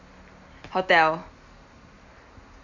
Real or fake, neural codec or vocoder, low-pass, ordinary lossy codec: real; none; 7.2 kHz; MP3, 64 kbps